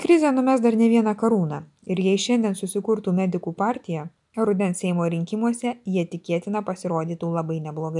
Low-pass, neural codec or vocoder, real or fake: 10.8 kHz; none; real